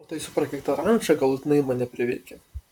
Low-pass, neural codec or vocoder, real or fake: 19.8 kHz; vocoder, 44.1 kHz, 128 mel bands, Pupu-Vocoder; fake